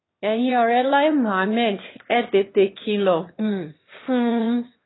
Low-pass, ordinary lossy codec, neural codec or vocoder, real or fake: 7.2 kHz; AAC, 16 kbps; autoencoder, 22.05 kHz, a latent of 192 numbers a frame, VITS, trained on one speaker; fake